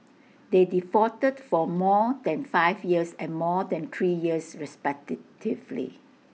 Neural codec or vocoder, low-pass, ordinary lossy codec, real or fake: none; none; none; real